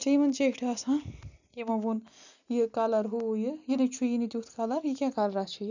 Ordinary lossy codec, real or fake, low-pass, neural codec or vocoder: none; real; 7.2 kHz; none